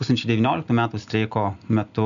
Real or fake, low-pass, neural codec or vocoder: real; 7.2 kHz; none